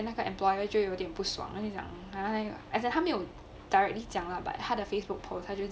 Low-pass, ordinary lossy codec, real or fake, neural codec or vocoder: none; none; real; none